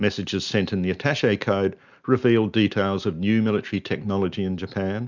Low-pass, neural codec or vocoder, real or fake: 7.2 kHz; none; real